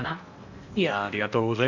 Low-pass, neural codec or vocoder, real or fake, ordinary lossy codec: 7.2 kHz; codec, 16 kHz in and 24 kHz out, 0.8 kbps, FocalCodec, streaming, 65536 codes; fake; none